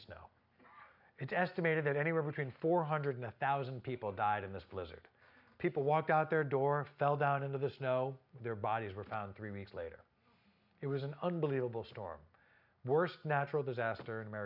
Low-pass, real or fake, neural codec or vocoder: 5.4 kHz; real; none